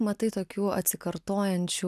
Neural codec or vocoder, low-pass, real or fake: none; 14.4 kHz; real